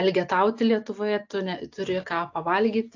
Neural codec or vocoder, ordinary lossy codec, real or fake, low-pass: none; AAC, 48 kbps; real; 7.2 kHz